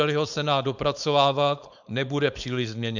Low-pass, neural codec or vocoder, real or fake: 7.2 kHz; codec, 16 kHz, 4.8 kbps, FACodec; fake